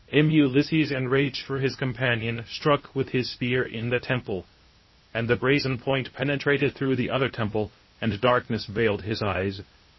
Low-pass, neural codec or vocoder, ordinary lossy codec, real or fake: 7.2 kHz; codec, 16 kHz, 0.8 kbps, ZipCodec; MP3, 24 kbps; fake